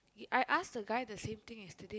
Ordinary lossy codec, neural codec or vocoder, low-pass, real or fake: none; none; none; real